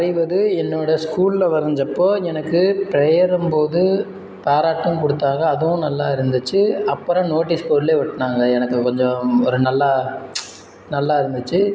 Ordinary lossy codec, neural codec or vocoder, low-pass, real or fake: none; none; none; real